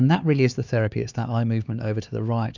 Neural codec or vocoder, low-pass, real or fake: codec, 16 kHz, 4 kbps, X-Codec, HuBERT features, trained on LibriSpeech; 7.2 kHz; fake